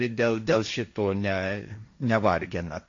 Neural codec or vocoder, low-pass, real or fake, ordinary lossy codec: codec, 16 kHz, 1.1 kbps, Voila-Tokenizer; 7.2 kHz; fake; AAC, 48 kbps